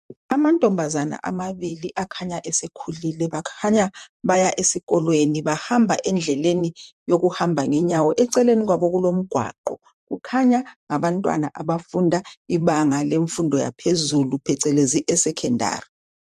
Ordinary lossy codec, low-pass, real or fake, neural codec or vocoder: MP3, 64 kbps; 14.4 kHz; fake; vocoder, 44.1 kHz, 128 mel bands, Pupu-Vocoder